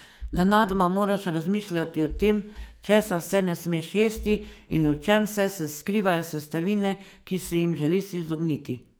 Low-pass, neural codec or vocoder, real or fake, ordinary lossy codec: none; codec, 44.1 kHz, 2.6 kbps, SNAC; fake; none